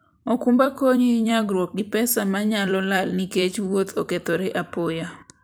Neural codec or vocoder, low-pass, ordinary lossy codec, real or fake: vocoder, 44.1 kHz, 128 mel bands every 256 samples, BigVGAN v2; none; none; fake